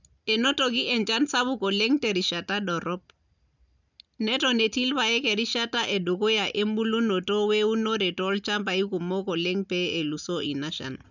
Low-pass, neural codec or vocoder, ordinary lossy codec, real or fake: 7.2 kHz; none; none; real